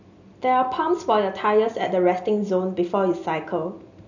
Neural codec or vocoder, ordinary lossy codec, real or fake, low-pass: none; none; real; 7.2 kHz